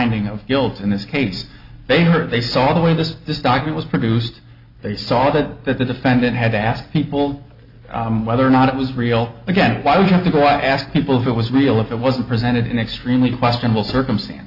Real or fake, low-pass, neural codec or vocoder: real; 5.4 kHz; none